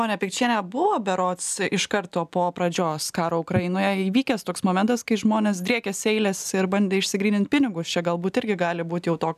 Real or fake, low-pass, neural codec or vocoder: real; 14.4 kHz; none